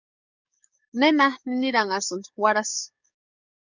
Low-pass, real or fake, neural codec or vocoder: 7.2 kHz; fake; codec, 44.1 kHz, 7.8 kbps, DAC